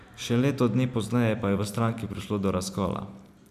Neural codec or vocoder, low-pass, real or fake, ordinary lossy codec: vocoder, 44.1 kHz, 128 mel bands every 512 samples, BigVGAN v2; 14.4 kHz; fake; none